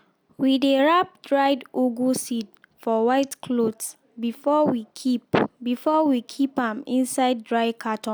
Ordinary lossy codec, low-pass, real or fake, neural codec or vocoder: none; none; real; none